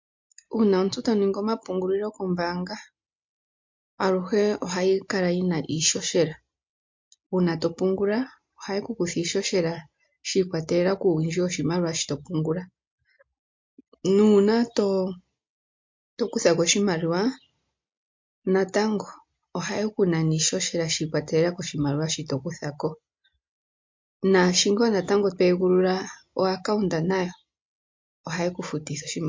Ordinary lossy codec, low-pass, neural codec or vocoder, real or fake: MP3, 48 kbps; 7.2 kHz; none; real